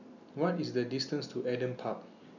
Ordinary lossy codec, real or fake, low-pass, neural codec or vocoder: none; real; 7.2 kHz; none